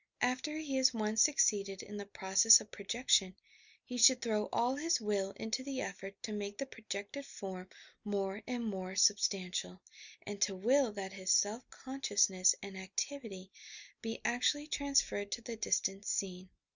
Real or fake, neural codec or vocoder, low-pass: real; none; 7.2 kHz